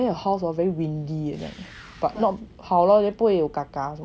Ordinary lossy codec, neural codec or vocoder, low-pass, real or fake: none; none; none; real